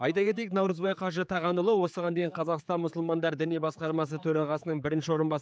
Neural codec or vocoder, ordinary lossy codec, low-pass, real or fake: codec, 16 kHz, 4 kbps, X-Codec, HuBERT features, trained on general audio; none; none; fake